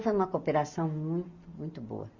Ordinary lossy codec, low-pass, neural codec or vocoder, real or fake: none; 7.2 kHz; none; real